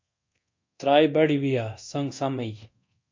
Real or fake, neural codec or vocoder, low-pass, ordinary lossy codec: fake; codec, 24 kHz, 0.9 kbps, DualCodec; 7.2 kHz; MP3, 48 kbps